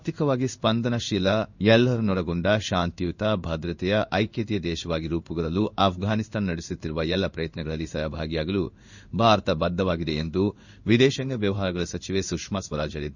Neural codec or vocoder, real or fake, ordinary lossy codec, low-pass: codec, 16 kHz in and 24 kHz out, 1 kbps, XY-Tokenizer; fake; none; 7.2 kHz